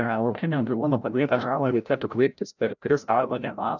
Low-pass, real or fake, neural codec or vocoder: 7.2 kHz; fake; codec, 16 kHz, 0.5 kbps, FreqCodec, larger model